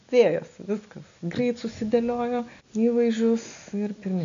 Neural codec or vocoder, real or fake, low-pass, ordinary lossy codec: none; real; 7.2 kHz; AAC, 64 kbps